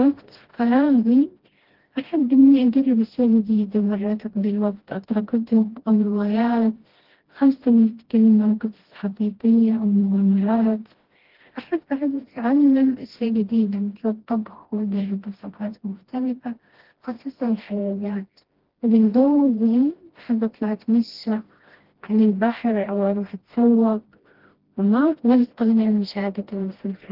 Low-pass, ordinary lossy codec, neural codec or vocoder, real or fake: 5.4 kHz; Opus, 16 kbps; codec, 16 kHz, 1 kbps, FreqCodec, smaller model; fake